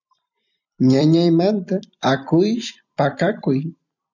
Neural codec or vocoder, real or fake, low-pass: vocoder, 44.1 kHz, 128 mel bands every 256 samples, BigVGAN v2; fake; 7.2 kHz